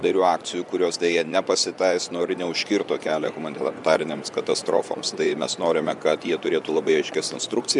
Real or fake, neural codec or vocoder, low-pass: fake; vocoder, 24 kHz, 100 mel bands, Vocos; 10.8 kHz